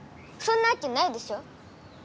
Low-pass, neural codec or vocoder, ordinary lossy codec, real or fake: none; none; none; real